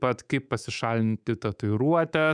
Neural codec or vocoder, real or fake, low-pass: codec, 24 kHz, 3.1 kbps, DualCodec; fake; 9.9 kHz